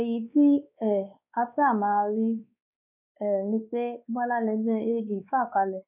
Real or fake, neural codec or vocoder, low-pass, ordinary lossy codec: fake; codec, 16 kHz, 2 kbps, X-Codec, WavLM features, trained on Multilingual LibriSpeech; 3.6 kHz; none